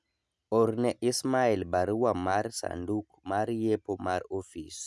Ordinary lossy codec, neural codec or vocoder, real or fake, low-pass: none; none; real; none